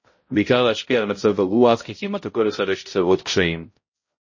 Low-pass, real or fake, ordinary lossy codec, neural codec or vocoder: 7.2 kHz; fake; MP3, 32 kbps; codec, 16 kHz, 0.5 kbps, X-Codec, HuBERT features, trained on balanced general audio